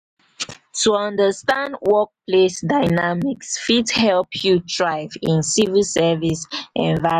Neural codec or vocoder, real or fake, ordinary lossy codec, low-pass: none; real; none; 14.4 kHz